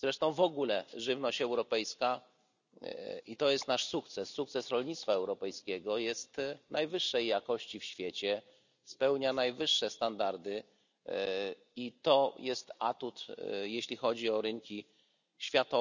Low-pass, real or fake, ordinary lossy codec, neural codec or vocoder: 7.2 kHz; real; none; none